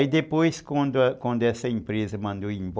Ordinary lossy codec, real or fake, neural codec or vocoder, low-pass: none; real; none; none